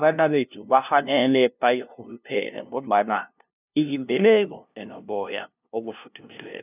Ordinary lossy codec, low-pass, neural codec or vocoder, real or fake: none; 3.6 kHz; codec, 16 kHz, 0.5 kbps, FunCodec, trained on LibriTTS, 25 frames a second; fake